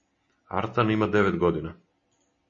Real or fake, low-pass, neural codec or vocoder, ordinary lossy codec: real; 7.2 kHz; none; MP3, 32 kbps